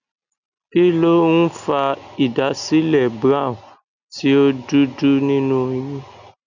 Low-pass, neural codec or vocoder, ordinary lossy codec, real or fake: 7.2 kHz; none; none; real